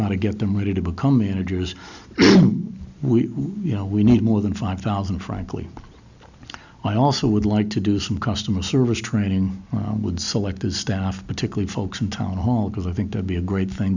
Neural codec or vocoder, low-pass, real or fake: none; 7.2 kHz; real